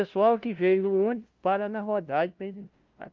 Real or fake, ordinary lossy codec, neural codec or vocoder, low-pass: fake; Opus, 32 kbps; codec, 16 kHz, 0.5 kbps, FunCodec, trained on LibriTTS, 25 frames a second; 7.2 kHz